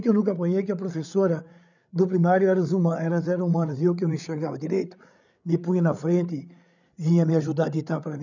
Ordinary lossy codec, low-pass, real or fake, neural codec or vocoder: none; 7.2 kHz; fake; codec, 16 kHz, 8 kbps, FreqCodec, larger model